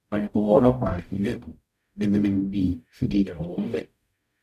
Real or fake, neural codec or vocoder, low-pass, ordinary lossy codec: fake; codec, 44.1 kHz, 0.9 kbps, DAC; 14.4 kHz; none